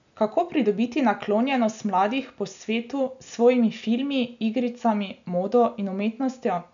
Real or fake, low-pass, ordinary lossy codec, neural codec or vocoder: real; 7.2 kHz; none; none